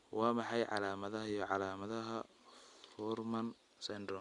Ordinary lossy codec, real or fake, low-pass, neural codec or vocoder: none; real; 10.8 kHz; none